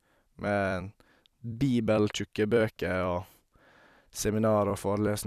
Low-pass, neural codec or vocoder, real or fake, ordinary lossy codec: 14.4 kHz; vocoder, 44.1 kHz, 128 mel bands every 256 samples, BigVGAN v2; fake; none